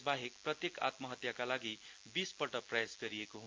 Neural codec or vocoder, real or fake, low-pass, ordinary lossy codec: none; real; 7.2 kHz; Opus, 24 kbps